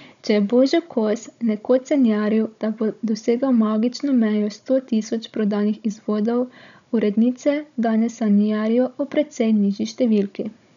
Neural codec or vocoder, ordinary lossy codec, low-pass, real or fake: codec, 16 kHz, 16 kbps, FunCodec, trained on Chinese and English, 50 frames a second; none; 7.2 kHz; fake